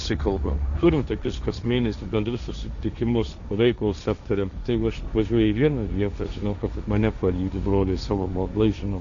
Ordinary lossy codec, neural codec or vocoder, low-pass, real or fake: MP3, 96 kbps; codec, 16 kHz, 1.1 kbps, Voila-Tokenizer; 7.2 kHz; fake